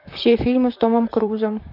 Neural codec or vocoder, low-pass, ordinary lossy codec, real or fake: vocoder, 44.1 kHz, 128 mel bands, Pupu-Vocoder; 5.4 kHz; none; fake